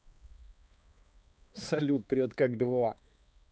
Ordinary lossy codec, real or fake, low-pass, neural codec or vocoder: none; fake; none; codec, 16 kHz, 2 kbps, X-Codec, HuBERT features, trained on balanced general audio